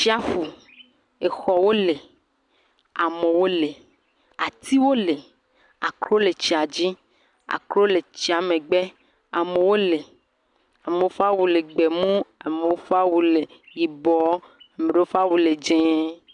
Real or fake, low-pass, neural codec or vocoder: real; 10.8 kHz; none